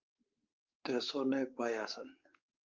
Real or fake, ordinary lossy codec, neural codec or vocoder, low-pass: fake; Opus, 24 kbps; codec, 16 kHz, 4 kbps, X-Codec, WavLM features, trained on Multilingual LibriSpeech; 7.2 kHz